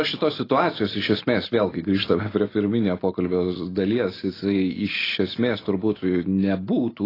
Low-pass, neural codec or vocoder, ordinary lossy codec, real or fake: 5.4 kHz; none; AAC, 24 kbps; real